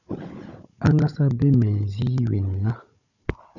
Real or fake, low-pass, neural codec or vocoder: fake; 7.2 kHz; codec, 16 kHz, 16 kbps, FunCodec, trained on Chinese and English, 50 frames a second